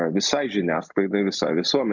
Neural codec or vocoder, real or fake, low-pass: none; real; 7.2 kHz